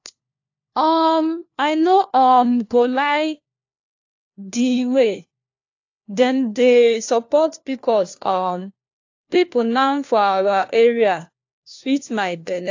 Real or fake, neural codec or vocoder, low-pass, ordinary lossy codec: fake; codec, 16 kHz, 1 kbps, FunCodec, trained on LibriTTS, 50 frames a second; 7.2 kHz; AAC, 48 kbps